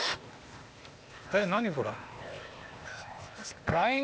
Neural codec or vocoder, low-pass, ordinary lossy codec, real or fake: codec, 16 kHz, 0.8 kbps, ZipCodec; none; none; fake